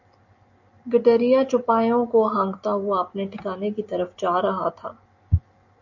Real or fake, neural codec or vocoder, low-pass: real; none; 7.2 kHz